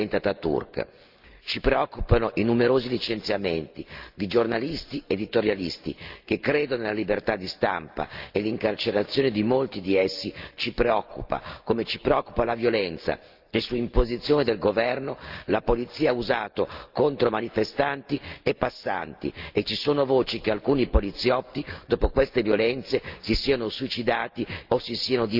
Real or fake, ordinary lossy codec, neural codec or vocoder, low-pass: real; Opus, 24 kbps; none; 5.4 kHz